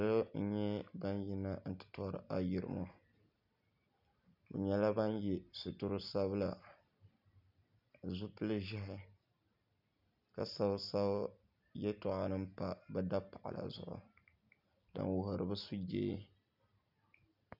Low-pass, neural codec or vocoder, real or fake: 5.4 kHz; none; real